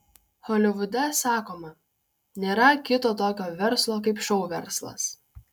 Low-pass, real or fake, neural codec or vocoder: 19.8 kHz; real; none